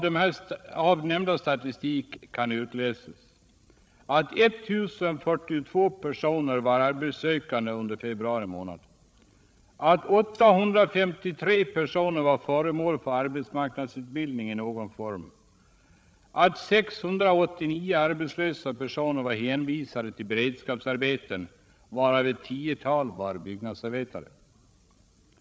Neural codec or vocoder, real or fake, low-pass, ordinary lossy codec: codec, 16 kHz, 16 kbps, FreqCodec, larger model; fake; none; none